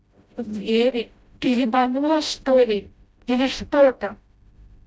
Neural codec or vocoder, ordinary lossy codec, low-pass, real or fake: codec, 16 kHz, 0.5 kbps, FreqCodec, smaller model; none; none; fake